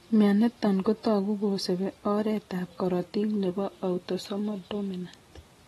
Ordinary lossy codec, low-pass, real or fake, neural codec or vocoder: AAC, 32 kbps; 19.8 kHz; real; none